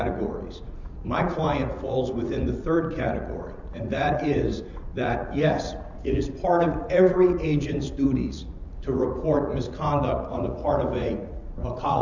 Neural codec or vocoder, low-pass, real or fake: vocoder, 44.1 kHz, 80 mel bands, Vocos; 7.2 kHz; fake